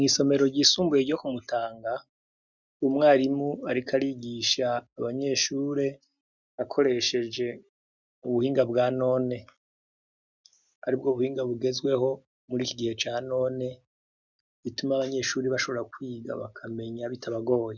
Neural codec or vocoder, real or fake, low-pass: none; real; 7.2 kHz